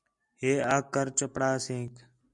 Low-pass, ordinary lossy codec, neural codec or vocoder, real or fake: 10.8 kHz; MP3, 96 kbps; none; real